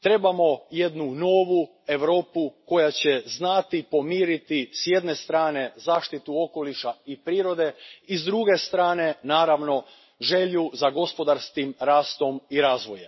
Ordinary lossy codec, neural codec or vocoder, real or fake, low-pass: MP3, 24 kbps; none; real; 7.2 kHz